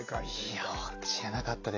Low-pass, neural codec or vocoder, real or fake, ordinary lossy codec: 7.2 kHz; none; real; AAC, 48 kbps